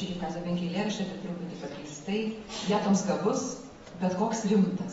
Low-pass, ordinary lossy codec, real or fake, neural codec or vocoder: 19.8 kHz; AAC, 24 kbps; real; none